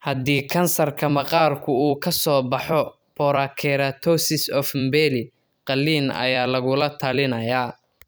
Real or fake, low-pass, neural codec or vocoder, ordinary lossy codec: fake; none; vocoder, 44.1 kHz, 128 mel bands every 256 samples, BigVGAN v2; none